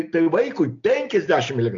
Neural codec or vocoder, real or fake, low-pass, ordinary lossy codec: none; real; 7.2 kHz; MP3, 48 kbps